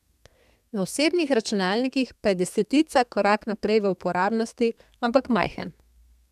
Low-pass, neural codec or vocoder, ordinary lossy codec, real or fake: 14.4 kHz; codec, 32 kHz, 1.9 kbps, SNAC; none; fake